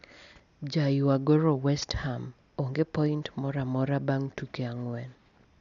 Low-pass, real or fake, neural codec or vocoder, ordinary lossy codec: 7.2 kHz; real; none; none